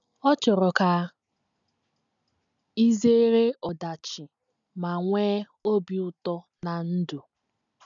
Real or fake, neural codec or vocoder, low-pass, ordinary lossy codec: real; none; 7.2 kHz; none